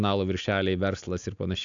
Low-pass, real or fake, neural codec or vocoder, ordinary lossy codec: 7.2 kHz; real; none; AAC, 64 kbps